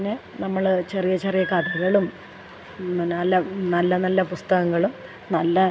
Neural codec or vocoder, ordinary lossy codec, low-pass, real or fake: none; none; none; real